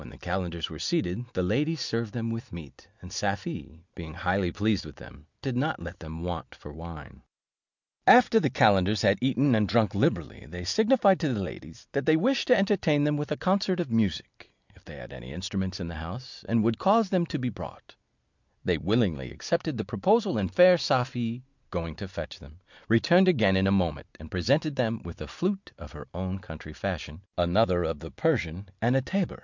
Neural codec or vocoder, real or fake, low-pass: none; real; 7.2 kHz